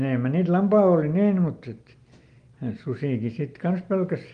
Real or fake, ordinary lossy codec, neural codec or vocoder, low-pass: real; Opus, 24 kbps; none; 9.9 kHz